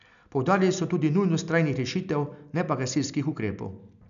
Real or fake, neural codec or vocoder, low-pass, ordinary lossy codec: real; none; 7.2 kHz; none